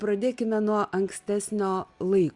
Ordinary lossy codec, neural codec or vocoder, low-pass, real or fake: Opus, 64 kbps; none; 10.8 kHz; real